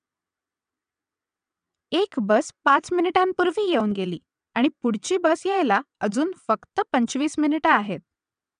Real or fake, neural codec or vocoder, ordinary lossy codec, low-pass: fake; vocoder, 22.05 kHz, 80 mel bands, WaveNeXt; none; 9.9 kHz